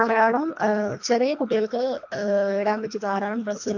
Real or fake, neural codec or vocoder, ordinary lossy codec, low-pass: fake; codec, 24 kHz, 1.5 kbps, HILCodec; AAC, 48 kbps; 7.2 kHz